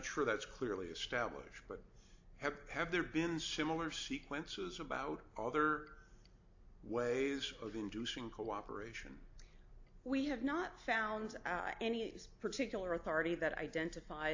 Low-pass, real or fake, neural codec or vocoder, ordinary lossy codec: 7.2 kHz; real; none; AAC, 48 kbps